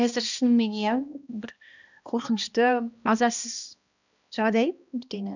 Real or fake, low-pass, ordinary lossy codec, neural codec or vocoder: fake; 7.2 kHz; none; codec, 16 kHz, 1 kbps, X-Codec, HuBERT features, trained on balanced general audio